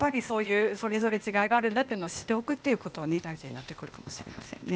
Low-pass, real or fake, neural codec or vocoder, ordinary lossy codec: none; fake; codec, 16 kHz, 0.8 kbps, ZipCodec; none